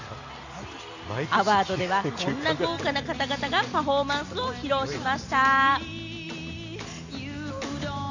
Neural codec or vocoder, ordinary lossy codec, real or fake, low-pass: none; Opus, 64 kbps; real; 7.2 kHz